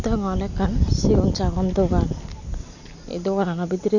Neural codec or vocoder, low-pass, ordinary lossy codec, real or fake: none; 7.2 kHz; none; real